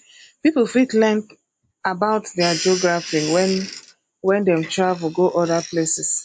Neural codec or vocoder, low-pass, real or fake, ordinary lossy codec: none; 9.9 kHz; real; MP3, 48 kbps